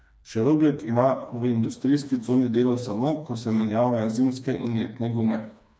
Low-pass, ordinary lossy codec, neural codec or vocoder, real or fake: none; none; codec, 16 kHz, 2 kbps, FreqCodec, smaller model; fake